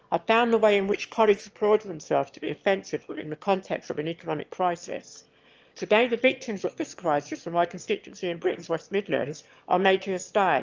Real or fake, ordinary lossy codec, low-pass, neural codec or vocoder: fake; Opus, 24 kbps; 7.2 kHz; autoencoder, 22.05 kHz, a latent of 192 numbers a frame, VITS, trained on one speaker